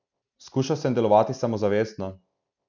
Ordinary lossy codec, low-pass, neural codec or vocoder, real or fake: none; 7.2 kHz; none; real